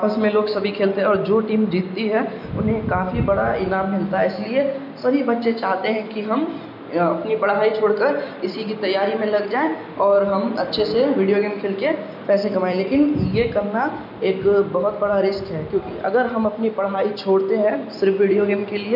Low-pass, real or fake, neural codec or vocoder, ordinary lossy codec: 5.4 kHz; real; none; none